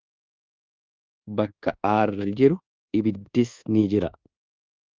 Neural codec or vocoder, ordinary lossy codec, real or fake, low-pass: codec, 24 kHz, 1.2 kbps, DualCodec; Opus, 32 kbps; fake; 7.2 kHz